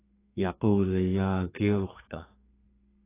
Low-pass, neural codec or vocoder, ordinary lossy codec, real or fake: 3.6 kHz; codec, 32 kHz, 1.9 kbps, SNAC; AAC, 16 kbps; fake